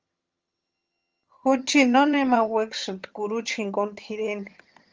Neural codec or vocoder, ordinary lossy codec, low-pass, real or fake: vocoder, 22.05 kHz, 80 mel bands, HiFi-GAN; Opus, 24 kbps; 7.2 kHz; fake